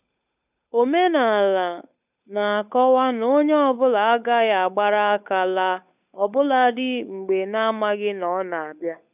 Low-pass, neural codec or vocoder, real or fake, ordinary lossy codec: 3.6 kHz; codec, 16 kHz, 8 kbps, FunCodec, trained on Chinese and English, 25 frames a second; fake; none